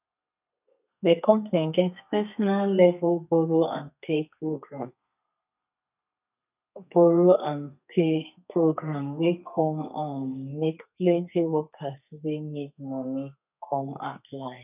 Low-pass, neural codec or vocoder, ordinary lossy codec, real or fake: 3.6 kHz; codec, 32 kHz, 1.9 kbps, SNAC; none; fake